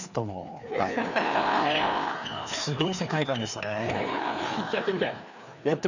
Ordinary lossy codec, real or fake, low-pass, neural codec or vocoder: none; fake; 7.2 kHz; codec, 16 kHz, 2 kbps, FreqCodec, larger model